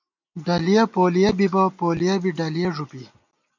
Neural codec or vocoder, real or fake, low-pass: none; real; 7.2 kHz